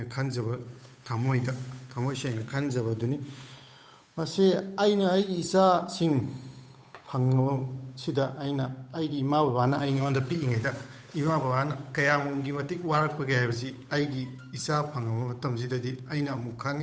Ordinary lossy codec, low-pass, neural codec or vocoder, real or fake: none; none; codec, 16 kHz, 8 kbps, FunCodec, trained on Chinese and English, 25 frames a second; fake